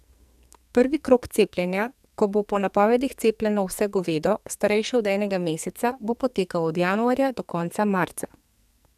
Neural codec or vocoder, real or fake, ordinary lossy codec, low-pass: codec, 44.1 kHz, 2.6 kbps, SNAC; fake; none; 14.4 kHz